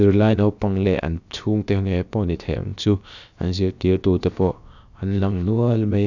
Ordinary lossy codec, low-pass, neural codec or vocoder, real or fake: none; 7.2 kHz; codec, 16 kHz, about 1 kbps, DyCAST, with the encoder's durations; fake